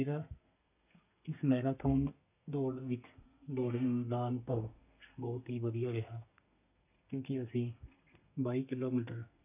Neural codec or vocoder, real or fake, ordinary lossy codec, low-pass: codec, 32 kHz, 1.9 kbps, SNAC; fake; none; 3.6 kHz